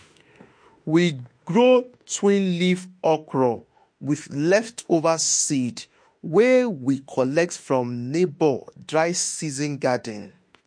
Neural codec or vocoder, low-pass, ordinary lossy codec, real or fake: autoencoder, 48 kHz, 32 numbers a frame, DAC-VAE, trained on Japanese speech; 9.9 kHz; MP3, 48 kbps; fake